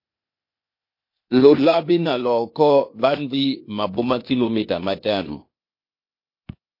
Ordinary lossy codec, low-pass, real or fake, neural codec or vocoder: MP3, 32 kbps; 5.4 kHz; fake; codec, 16 kHz, 0.8 kbps, ZipCodec